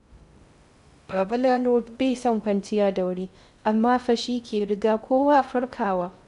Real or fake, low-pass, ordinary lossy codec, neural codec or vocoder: fake; 10.8 kHz; none; codec, 16 kHz in and 24 kHz out, 0.6 kbps, FocalCodec, streaming, 2048 codes